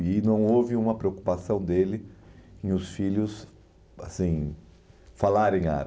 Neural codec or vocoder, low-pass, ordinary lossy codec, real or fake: none; none; none; real